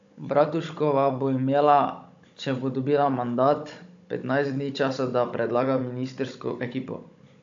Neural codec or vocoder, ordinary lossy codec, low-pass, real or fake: codec, 16 kHz, 16 kbps, FunCodec, trained on Chinese and English, 50 frames a second; AAC, 64 kbps; 7.2 kHz; fake